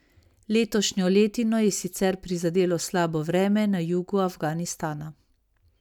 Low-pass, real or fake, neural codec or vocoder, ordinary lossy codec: 19.8 kHz; real; none; none